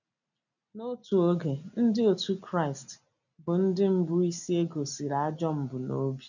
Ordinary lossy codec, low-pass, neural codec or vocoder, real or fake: none; 7.2 kHz; none; real